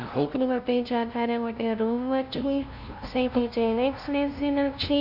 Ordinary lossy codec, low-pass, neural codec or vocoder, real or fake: none; 5.4 kHz; codec, 16 kHz, 0.5 kbps, FunCodec, trained on LibriTTS, 25 frames a second; fake